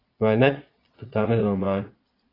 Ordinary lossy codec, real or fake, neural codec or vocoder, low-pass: AAC, 24 kbps; fake; vocoder, 22.05 kHz, 80 mel bands, Vocos; 5.4 kHz